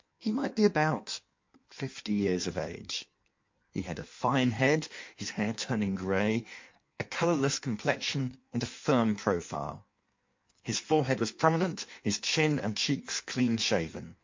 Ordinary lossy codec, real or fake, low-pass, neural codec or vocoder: MP3, 48 kbps; fake; 7.2 kHz; codec, 16 kHz in and 24 kHz out, 1.1 kbps, FireRedTTS-2 codec